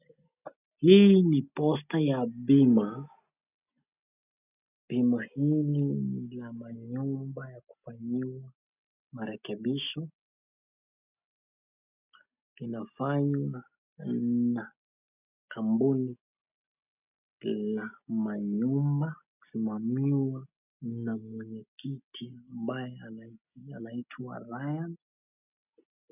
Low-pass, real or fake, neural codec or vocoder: 3.6 kHz; real; none